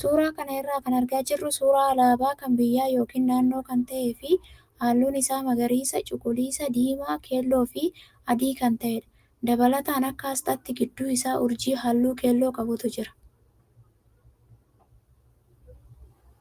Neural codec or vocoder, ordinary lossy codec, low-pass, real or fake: none; Opus, 32 kbps; 14.4 kHz; real